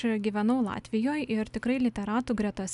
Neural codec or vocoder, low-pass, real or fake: none; 10.8 kHz; real